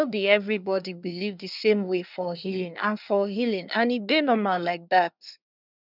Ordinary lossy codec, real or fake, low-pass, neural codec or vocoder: none; fake; 5.4 kHz; codec, 24 kHz, 1 kbps, SNAC